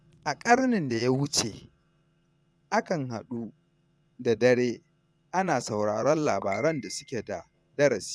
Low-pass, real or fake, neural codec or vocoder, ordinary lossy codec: none; fake; vocoder, 22.05 kHz, 80 mel bands, Vocos; none